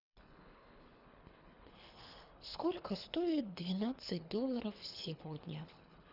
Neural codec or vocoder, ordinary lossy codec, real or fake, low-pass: codec, 24 kHz, 3 kbps, HILCodec; none; fake; 5.4 kHz